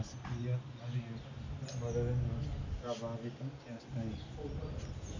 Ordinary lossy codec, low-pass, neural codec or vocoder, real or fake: MP3, 64 kbps; 7.2 kHz; none; real